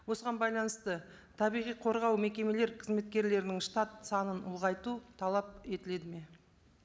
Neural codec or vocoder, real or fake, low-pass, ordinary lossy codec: none; real; none; none